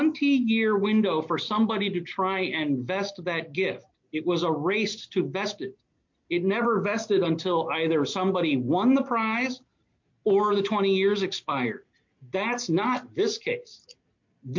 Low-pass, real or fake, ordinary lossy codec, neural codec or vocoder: 7.2 kHz; real; MP3, 48 kbps; none